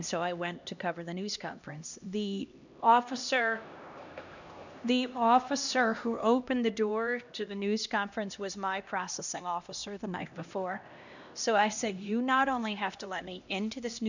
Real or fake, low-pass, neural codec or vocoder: fake; 7.2 kHz; codec, 16 kHz, 1 kbps, X-Codec, HuBERT features, trained on LibriSpeech